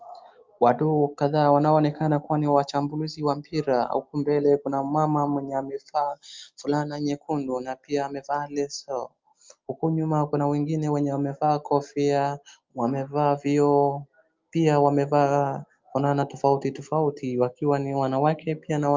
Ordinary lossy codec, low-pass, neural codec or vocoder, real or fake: Opus, 24 kbps; 7.2 kHz; none; real